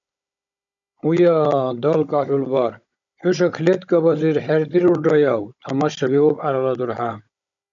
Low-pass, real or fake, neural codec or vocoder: 7.2 kHz; fake; codec, 16 kHz, 16 kbps, FunCodec, trained on Chinese and English, 50 frames a second